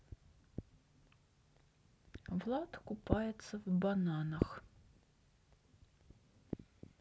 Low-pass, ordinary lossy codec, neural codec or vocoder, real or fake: none; none; none; real